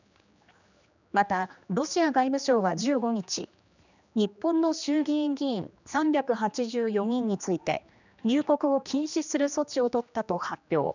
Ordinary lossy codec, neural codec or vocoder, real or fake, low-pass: none; codec, 16 kHz, 2 kbps, X-Codec, HuBERT features, trained on general audio; fake; 7.2 kHz